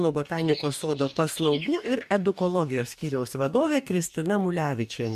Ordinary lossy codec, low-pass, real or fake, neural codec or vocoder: MP3, 96 kbps; 14.4 kHz; fake; codec, 44.1 kHz, 2.6 kbps, DAC